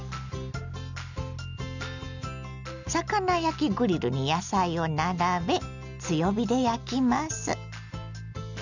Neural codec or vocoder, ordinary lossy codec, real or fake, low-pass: none; none; real; 7.2 kHz